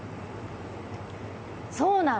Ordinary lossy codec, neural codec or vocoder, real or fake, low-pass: none; none; real; none